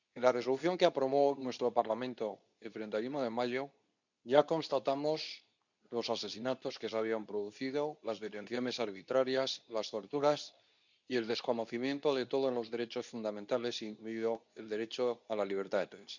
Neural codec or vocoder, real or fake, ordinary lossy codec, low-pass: codec, 24 kHz, 0.9 kbps, WavTokenizer, medium speech release version 2; fake; none; 7.2 kHz